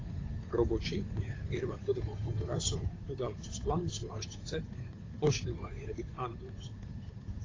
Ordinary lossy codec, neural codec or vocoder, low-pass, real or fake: AAC, 32 kbps; codec, 16 kHz, 8 kbps, FunCodec, trained on Chinese and English, 25 frames a second; 7.2 kHz; fake